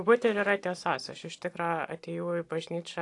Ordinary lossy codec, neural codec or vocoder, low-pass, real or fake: AAC, 48 kbps; none; 10.8 kHz; real